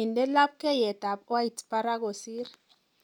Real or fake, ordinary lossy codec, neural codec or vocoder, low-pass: real; none; none; 19.8 kHz